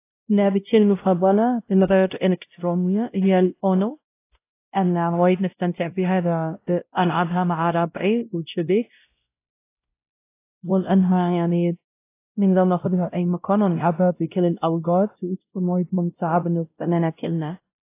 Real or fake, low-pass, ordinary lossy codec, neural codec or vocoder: fake; 3.6 kHz; AAC, 24 kbps; codec, 16 kHz, 0.5 kbps, X-Codec, WavLM features, trained on Multilingual LibriSpeech